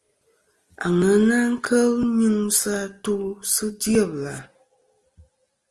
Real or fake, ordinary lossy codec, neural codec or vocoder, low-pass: real; Opus, 24 kbps; none; 10.8 kHz